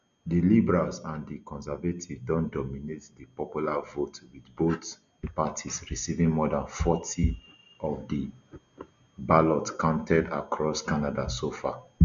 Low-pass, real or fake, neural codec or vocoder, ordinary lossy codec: 7.2 kHz; real; none; none